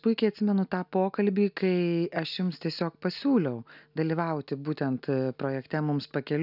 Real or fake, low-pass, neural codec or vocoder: real; 5.4 kHz; none